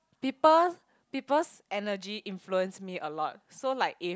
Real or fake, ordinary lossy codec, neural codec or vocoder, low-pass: real; none; none; none